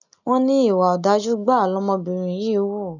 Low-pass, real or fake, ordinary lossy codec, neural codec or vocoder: 7.2 kHz; real; none; none